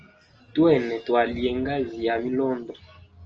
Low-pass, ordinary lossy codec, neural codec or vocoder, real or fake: 7.2 kHz; Opus, 24 kbps; none; real